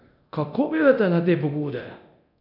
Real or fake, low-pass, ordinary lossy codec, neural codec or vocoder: fake; 5.4 kHz; none; codec, 24 kHz, 0.9 kbps, DualCodec